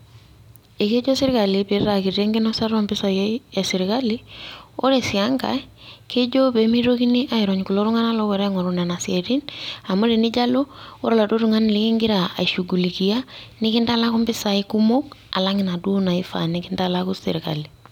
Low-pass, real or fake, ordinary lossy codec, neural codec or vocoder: 19.8 kHz; real; none; none